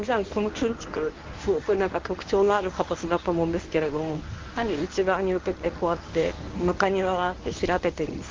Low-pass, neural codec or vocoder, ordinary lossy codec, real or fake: 7.2 kHz; codec, 24 kHz, 0.9 kbps, WavTokenizer, medium speech release version 1; Opus, 32 kbps; fake